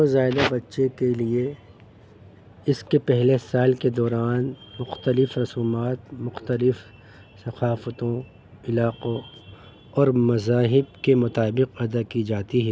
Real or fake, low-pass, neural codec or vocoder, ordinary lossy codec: real; none; none; none